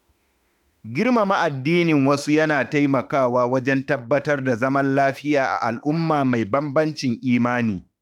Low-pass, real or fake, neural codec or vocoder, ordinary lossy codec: 19.8 kHz; fake; autoencoder, 48 kHz, 32 numbers a frame, DAC-VAE, trained on Japanese speech; none